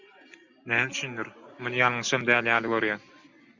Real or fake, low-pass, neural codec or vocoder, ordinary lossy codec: real; 7.2 kHz; none; Opus, 64 kbps